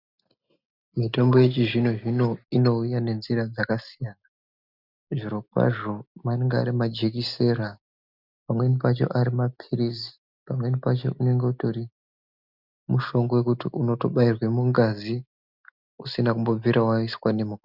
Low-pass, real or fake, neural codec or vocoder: 5.4 kHz; real; none